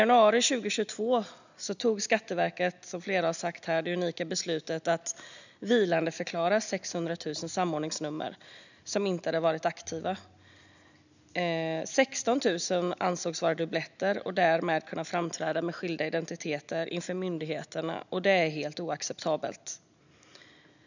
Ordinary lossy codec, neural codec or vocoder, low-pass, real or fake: none; none; 7.2 kHz; real